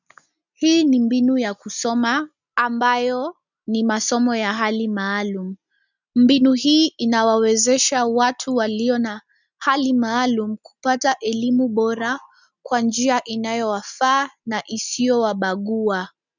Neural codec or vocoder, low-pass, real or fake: none; 7.2 kHz; real